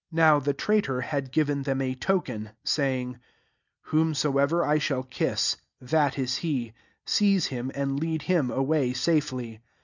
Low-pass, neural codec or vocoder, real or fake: 7.2 kHz; none; real